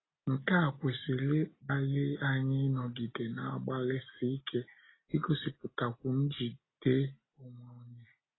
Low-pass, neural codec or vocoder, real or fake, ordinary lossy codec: 7.2 kHz; none; real; AAC, 16 kbps